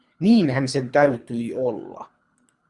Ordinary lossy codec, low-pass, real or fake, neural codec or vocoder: Opus, 64 kbps; 10.8 kHz; fake; codec, 24 kHz, 3 kbps, HILCodec